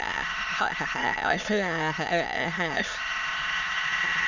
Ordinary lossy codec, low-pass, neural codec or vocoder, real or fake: none; 7.2 kHz; autoencoder, 22.05 kHz, a latent of 192 numbers a frame, VITS, trained on many speakers; fake